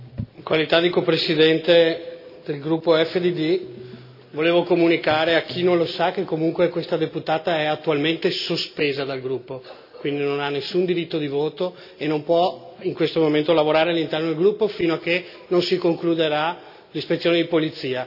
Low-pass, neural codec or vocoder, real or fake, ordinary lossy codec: 5.4 kHz; none; real; none